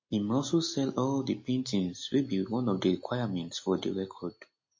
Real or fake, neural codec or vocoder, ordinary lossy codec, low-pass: real; none; MP3, 32 kbps; 7.2 kHz